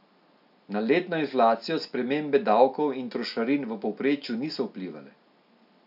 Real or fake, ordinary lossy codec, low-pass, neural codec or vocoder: real; none; 5.4 kHz; none